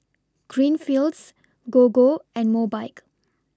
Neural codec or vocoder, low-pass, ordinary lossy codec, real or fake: none; none; none; real